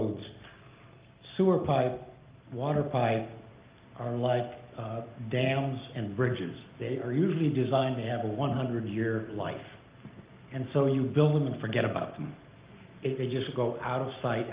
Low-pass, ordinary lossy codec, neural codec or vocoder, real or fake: 3.6 kHz; Opus, 32 kbps; none; real